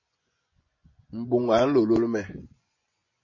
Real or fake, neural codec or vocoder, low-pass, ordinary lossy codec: fake; vocoder, 24 kHz, 100 mel bands, Vocos; 7.2 kHz; MP3, 32 kbps